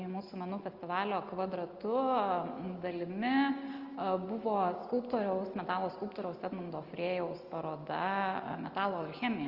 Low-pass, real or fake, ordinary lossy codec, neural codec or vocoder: 5.4 kHz; real; Opus, 24 kbps; none